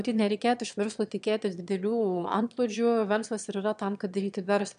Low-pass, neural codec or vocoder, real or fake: 9.9 kHz; autoencoder, 22.05 kHz, a latent of 192 numbers a frame, VITS, trained on one speaker; fake